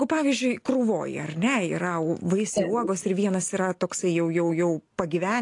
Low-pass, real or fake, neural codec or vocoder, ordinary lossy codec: 10.8 kHz; real; none; AAC, 48 kbps